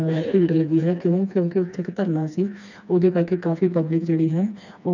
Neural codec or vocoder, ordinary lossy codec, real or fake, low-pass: codec, 16 kHz, 2 kbps, FreqCodec, smaller model; none; fake; 7.2 kHz